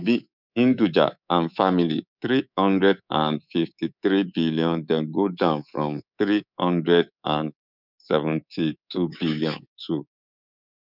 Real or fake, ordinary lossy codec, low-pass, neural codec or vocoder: fake; none; 5.4 kHz; codec, 24 kHz, 3.1 kbps, DualCodec